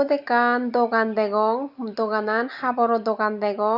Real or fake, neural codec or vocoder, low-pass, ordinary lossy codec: real; none; 5.4 kHz; Opus, 64 kbps